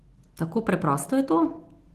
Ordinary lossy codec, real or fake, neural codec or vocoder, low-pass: Opus, 16 kbps; real; none; 14.4 kHz